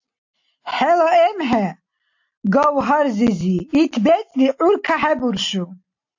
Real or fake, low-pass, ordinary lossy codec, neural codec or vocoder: real; 7.2 kHz; AAC, 48 kbps; none